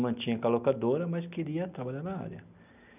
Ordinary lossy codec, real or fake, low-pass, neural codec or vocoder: none; real; 3.6 kHz; none